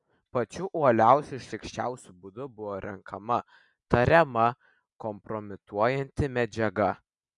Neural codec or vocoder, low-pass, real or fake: none; 10.8 kHz; real